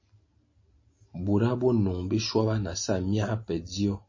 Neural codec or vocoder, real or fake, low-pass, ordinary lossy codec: none; real; 7.2 kHz; MP3, 32 kbps